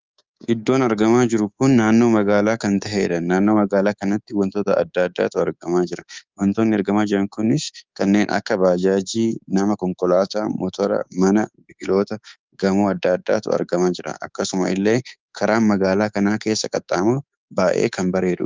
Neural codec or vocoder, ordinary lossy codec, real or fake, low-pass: codec, 24 kHz, 3.1 kbps, DualCodec; Opus, 32 kbps; fake; 7.2 kHz